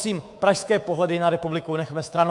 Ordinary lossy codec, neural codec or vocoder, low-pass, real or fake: AAC, 64 kbps; codec, 24 kHz, 3.1 kbps, DualCodec; 10.8 kHz; fake